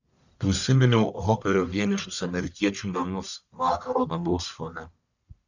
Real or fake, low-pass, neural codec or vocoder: fake; 7.2 kHz; codec, 44.1 kHz, 1.7 kbps, Pupu-Codec